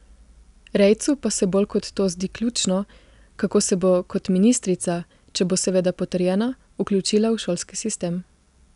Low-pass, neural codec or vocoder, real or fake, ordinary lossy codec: 10.8 kHz; none; real; none